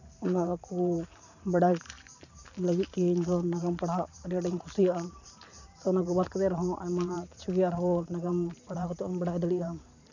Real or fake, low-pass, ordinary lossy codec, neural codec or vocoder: fake; 7.2 kHz; none; vocoder, 44.1 kHz, 128 mel bands every 512 samples, BigVGAN v2